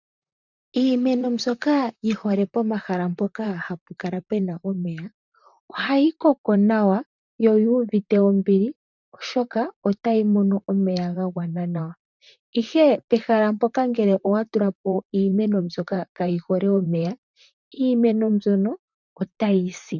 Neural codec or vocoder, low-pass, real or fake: vocoder, 44.1 kHz, 128 mel bands, Pupu-Vocoder; 7.2 kHz; fake